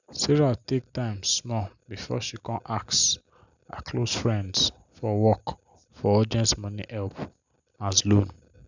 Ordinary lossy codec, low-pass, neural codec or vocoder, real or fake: none; 7.2 kHz; none; real